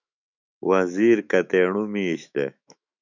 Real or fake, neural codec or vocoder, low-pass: fake; autoencoder, 48 kHz, 128 numbers a frame, DAC-VAE, trained on Japanese speech; 7.2 kHz